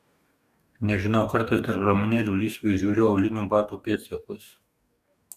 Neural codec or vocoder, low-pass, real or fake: codec, 44.1 kHz, 2.6 kbps, DAC; 14.4 kHz; fake